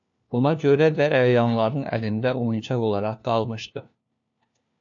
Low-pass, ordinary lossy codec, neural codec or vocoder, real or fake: 7.2 kHz; AAC, 64 kbps; codec, 16 kHz, 1 kbps, FunCodec, trained on LibriTTS, 50 frames a second; fake